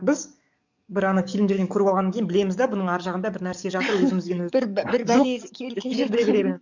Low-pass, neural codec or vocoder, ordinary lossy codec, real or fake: 7.2 kHz; codec, 44.1 kHz, 7.8 kbps, DAC; none; fake